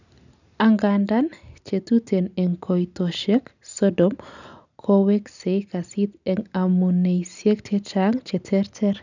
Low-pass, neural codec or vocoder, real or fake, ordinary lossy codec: 7.2 kHz; none; real; none